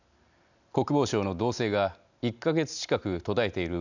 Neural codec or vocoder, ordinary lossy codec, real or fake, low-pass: none; none; real; 7.2 kHz